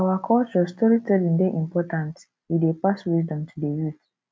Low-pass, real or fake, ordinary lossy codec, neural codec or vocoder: none; real; none; none